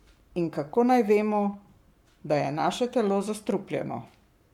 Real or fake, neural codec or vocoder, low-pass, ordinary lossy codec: fake; codec, 44.1 kHz, 7.8 kbps, Pupu-Codec; 19.8 kHz; MP3, 96 kbps